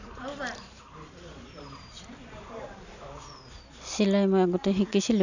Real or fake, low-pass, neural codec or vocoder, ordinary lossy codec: real; 7.2 kHz; none; none